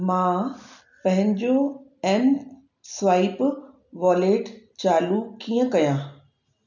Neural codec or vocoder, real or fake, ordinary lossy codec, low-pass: none; real; none; 7.2 kHz